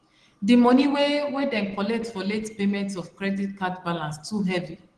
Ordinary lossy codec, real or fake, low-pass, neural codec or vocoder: Opus, 16 kbps; real; 9.9 kHz; none